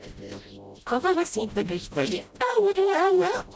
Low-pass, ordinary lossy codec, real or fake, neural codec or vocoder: none; none; fake; codec, 16 kHz, 0.5 kbps, FreqCodec, smaller model